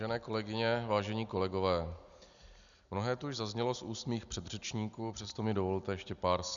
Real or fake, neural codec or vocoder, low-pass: real; none; 7.2 kHz